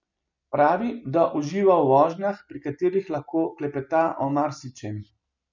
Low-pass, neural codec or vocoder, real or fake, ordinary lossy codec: 7.2 kHz; none; real; none